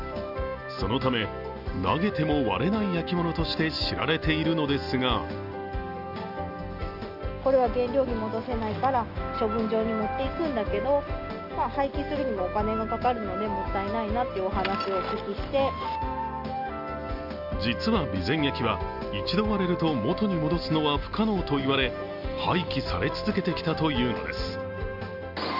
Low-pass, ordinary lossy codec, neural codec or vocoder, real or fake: 5.4 kHz; Opus, 32 kbps; none; real